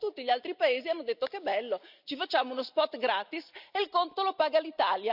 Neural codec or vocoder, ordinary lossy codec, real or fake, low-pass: none; none; real; 5.4 kHz